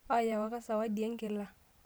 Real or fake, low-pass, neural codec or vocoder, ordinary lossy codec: fake; none; vocoder, 44.1 kHz, 128 mel bands every 512 samples, BigVGAN v2; none